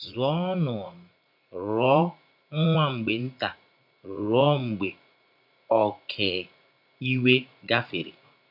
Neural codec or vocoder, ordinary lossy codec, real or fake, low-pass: vocoder, 44.1 kHz, 80 mel bands, Vocos; none; fake; 5.4 kHz